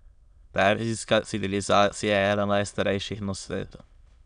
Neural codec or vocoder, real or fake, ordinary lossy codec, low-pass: autoencoder, 22.05 kHz, a latent of 192 numbers a frame, VITS, trained on many speakers; fake; none; 9.9 kHz